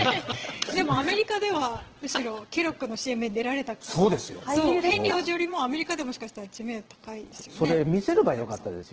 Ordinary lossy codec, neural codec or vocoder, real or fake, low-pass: Opus, 16 kbps; vocoder, 22.05 kHz, 80 mel bands, Vocos; fake; 7.2 kHz